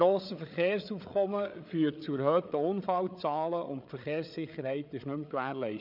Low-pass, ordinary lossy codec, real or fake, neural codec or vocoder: 5.4 kHz; none; fake; codec, 16 kHz, 8 kbps, FreqCodec, larger model